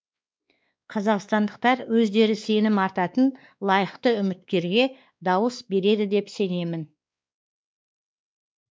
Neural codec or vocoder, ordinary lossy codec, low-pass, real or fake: codec, 16 kHz, 2 kbps, X-Codec, WavLM features, trained on Multilingual LibriSpeech; none; none; fake